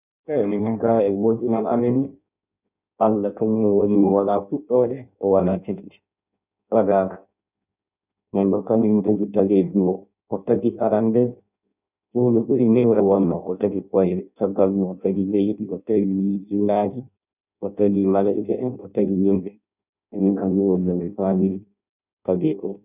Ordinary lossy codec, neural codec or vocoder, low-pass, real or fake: none; codec, 16 kHz in and 24 kHz out, 0.6 kbps, FireRedTTS-2 codec; 3.6 kHz; fake